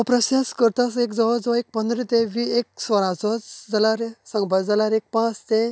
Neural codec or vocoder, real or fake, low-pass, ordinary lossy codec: none; real; none; none